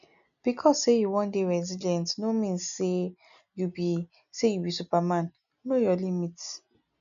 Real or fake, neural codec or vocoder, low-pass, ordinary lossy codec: real; none; 7.2 kHz; none